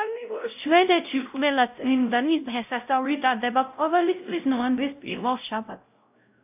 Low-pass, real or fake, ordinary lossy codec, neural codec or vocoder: 3.6 kHz; fake; AAC, 32 kbps; codec, 16 kHz, 0.5 kbps, X-Codec, WavLM features, trained on Multilingual LibriSpeech